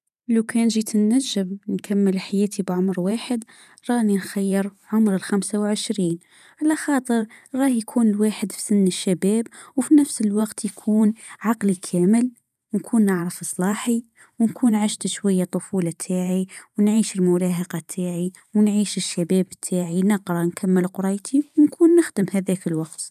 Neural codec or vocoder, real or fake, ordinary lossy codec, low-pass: vocoder, 44.1 kHz, 128 mel bands every 512 samples, BigVGAN v2; fake; none; 14.4 kHz